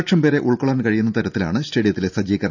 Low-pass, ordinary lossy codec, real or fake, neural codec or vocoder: 7.2 kHz; none; real; none